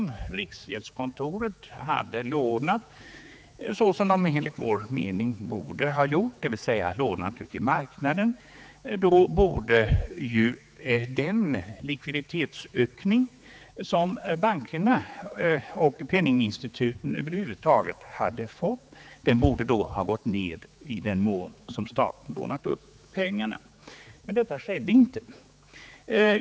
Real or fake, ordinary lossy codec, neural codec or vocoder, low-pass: fake; none; codec, 16 kHz, 4 kbps, X-Codec, HuBERT features, trained on general audio; none